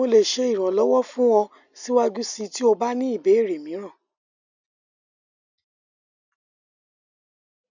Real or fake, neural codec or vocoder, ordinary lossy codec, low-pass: real; none; none; 7.2 kHz